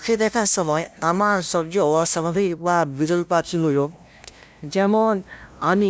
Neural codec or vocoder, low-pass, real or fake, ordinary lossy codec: codec, 16 kHz, 0.5 kbps, FunCodec, trained on LibriTTS, 25 frames a second; none; fake; none